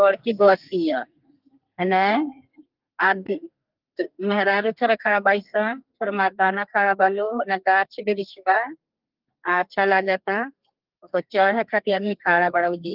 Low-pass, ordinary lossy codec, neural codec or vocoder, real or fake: 5.4 kHz; Opus, 32 kbps; codec, 32 kHz, 1.9 kbps, SNAC; fake